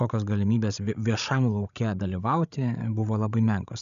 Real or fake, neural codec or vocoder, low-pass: fake; codec, 16 kHz, 16 kbps, FreqCodec, larger model; 7.2 kHz